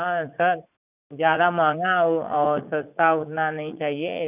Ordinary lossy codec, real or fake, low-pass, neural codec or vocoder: none; fake; 3.6 kHz; vocoder, 44.1 kHz, 80 mel bands, Vocos